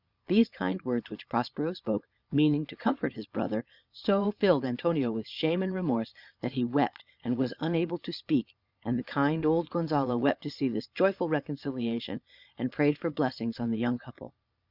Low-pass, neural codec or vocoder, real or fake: 5.4 kHz; vocoder, 22.05 kHz, 80 mel bands, Vocos; fake